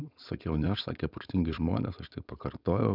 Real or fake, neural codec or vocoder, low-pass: fake; codec, 16 kHz, 4.8 kbps, FACodec; 5.4 kHz